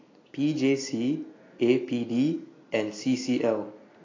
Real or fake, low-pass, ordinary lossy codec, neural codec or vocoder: real; 7.2 kHz; AAC, 32 kbps; none